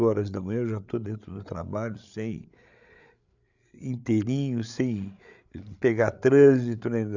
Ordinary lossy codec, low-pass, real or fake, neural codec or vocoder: none; 7.2 kHz; fake; codec, 16 kHz, 8 kbps, FreqCodec, larger model